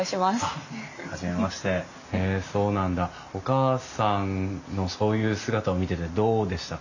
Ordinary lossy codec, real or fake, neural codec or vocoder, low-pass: none; real; none; 7.2 kHz